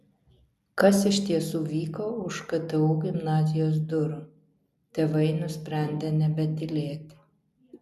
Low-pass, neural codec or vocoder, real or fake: 14.4 kHz; none; real